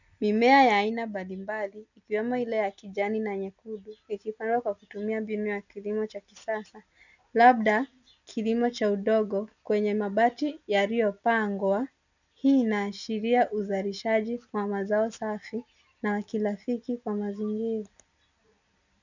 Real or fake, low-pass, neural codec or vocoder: real; 7.2 kHz; none